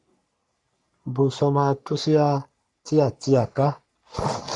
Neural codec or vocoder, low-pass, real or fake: codec, 44.1 kHz, 3.4 kbps, Pupu-Codec; 10.8 kHz; fake